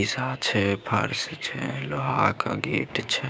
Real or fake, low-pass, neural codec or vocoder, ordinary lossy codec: fake; none; codec, 16 kHz, 6 kbps, DAC; none